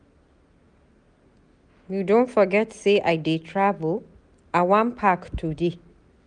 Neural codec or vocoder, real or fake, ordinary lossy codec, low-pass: none; real; none; 9.9 kHz